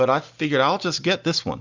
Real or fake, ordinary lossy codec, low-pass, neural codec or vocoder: real; Opus, 64 kbps; 7.2 kHz; none